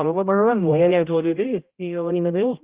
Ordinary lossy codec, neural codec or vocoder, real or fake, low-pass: Opus, 32 kbps; codec, 16 kHz, 0.5 kbps, X-Codec, HuBERT features, trained on general audio; fake; 3.6 kHz